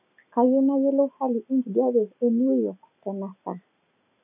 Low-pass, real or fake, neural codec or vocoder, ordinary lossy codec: 3.6 kHz; real; none; none